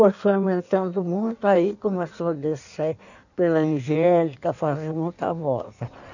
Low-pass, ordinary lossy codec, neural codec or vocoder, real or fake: 7.2 kHz; none; codec, 16 kHz in and 24 kHz out, 1.1 kbps, FireRedTTS-2 codec; fake